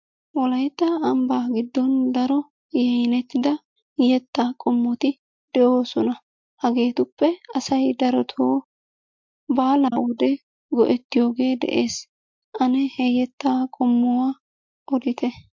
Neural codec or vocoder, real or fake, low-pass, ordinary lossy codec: none; real; 7.2 kHz; MP3, 48 kbps